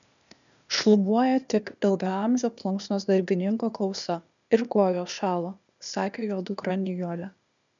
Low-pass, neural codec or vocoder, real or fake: 7.2 kHz; codec, 16 kHz, 0.8 kbps, ZipCodec; fake